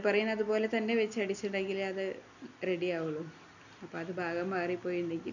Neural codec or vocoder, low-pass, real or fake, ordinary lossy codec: none; 7.2 kHz; real; none